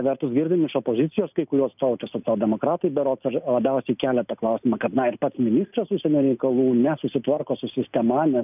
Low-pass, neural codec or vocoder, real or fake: 3.6 kHz; none; real